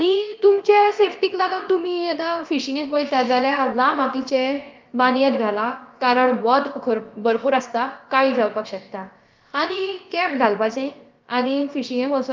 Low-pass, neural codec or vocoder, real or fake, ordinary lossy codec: 7.2 kHz; codec, 16 kHz, about 1 kbps, DyCAST, with the encoder's durations; fake; Opus, 24 kbps